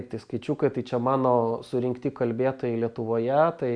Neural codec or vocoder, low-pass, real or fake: none; 9.9 kHz; real